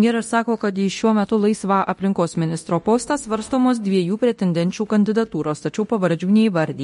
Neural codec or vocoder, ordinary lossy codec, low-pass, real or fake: codec, 24 kHz, 0.9 kbps, DualCodec; MP3, 48 kbps; 10.8 kHz; fake